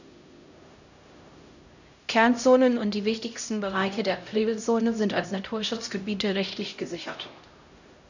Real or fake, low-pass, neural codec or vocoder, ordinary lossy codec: fake; 7.2 kHz; codec, 16 kHz, 0.5 kbps, X-Codec, HuBERT features, trained on LibriSpeech; none